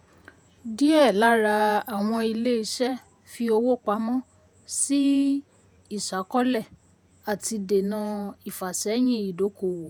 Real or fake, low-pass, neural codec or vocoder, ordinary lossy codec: fake; none; vocoder, 48 kHz, 128 mel bands, Vocos; none